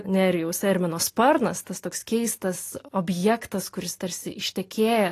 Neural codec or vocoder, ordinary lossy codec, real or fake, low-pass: none; AAC, 48 kbps; real; 14.4 kHz